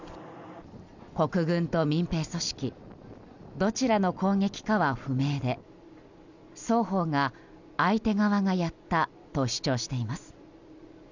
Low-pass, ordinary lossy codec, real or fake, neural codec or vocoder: 7.2 kHz; none; real; none